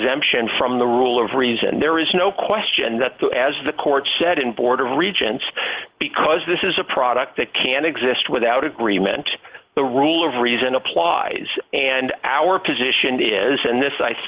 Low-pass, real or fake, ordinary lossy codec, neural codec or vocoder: 3.6 kHz; real; Opus, 16 kbps; none